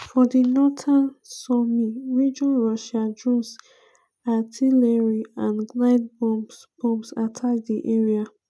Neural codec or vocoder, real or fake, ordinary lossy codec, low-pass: none; real; none; none